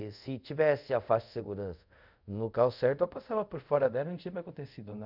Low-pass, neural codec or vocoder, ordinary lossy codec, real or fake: 5.4 kHz; codec, 24 kHz, 0.5 kbps, DualCodec; none; fake